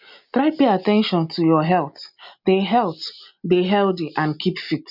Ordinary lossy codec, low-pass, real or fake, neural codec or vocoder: none; 5.4 kHz; real; none